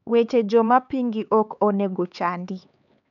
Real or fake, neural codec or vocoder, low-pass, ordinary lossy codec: fake; codec, 16 kHz, 4 kbps, X-Codec, HuBERT features, trained on LibriSpeech; 7.2 kHz; none